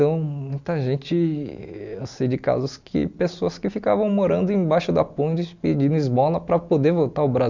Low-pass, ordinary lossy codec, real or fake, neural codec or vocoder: 7.2 kHz; none; real; none